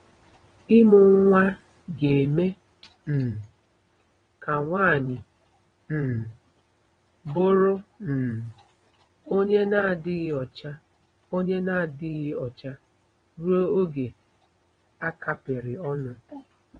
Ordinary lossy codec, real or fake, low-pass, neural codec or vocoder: AAC, 32 kbps; fake; 9.9 kHz; vocoder, 22.05 kHz, 80 mel bands, WaveNeXt